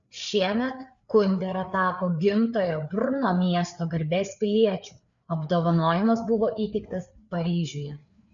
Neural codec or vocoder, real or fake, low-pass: codec, 16 kHz, 4 kbps, FreqCodec, larger model; fake; 7.2 kHz